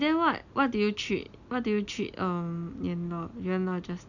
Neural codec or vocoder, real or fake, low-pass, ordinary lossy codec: none; real; 7.2 kHz; none